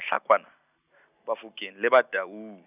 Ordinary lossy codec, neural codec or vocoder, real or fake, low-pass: none; none; real; 3.6 kHz